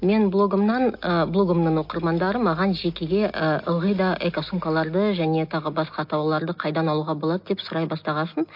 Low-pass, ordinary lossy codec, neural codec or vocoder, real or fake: 5.4 kHz; MP3, 32 kbps; none; real